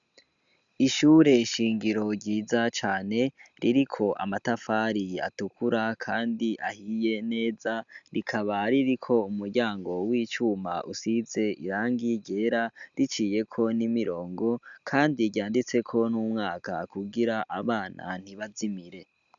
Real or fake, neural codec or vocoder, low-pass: real; none; 7.2 kHz